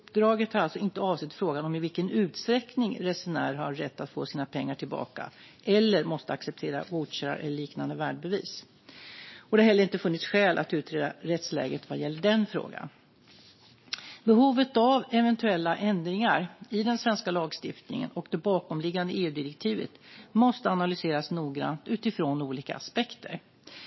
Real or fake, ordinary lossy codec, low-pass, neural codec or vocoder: real; MP3, 24 kbps; 7.2 kHz; none